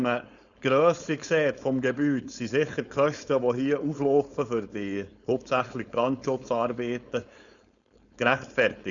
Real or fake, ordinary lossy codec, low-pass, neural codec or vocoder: fake; none; 7.2 kHz; codec, 16 kHz, 4.8 kbps, FACodec